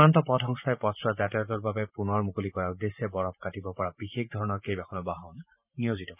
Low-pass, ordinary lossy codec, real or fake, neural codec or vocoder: 3.6 kHz; none; fake; vocoder, 44.1 kHz, 128 mel bands every 512 samples, BigVGAN v2